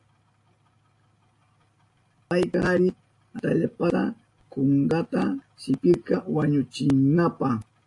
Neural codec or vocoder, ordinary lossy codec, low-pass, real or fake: none; AAC, 48 kbps; 10.8 kHz; real